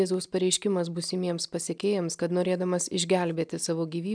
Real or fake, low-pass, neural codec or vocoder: real; 9.9 kHz; none